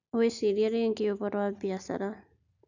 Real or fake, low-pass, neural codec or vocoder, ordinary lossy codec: real; 7.2 kHz; none; none